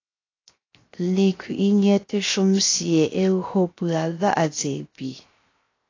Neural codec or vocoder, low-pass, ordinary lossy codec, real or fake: codec, 16 kHz, 0.7 kbps, FocalCodec; 7.2 kHz; AAC, 32 kbps; fake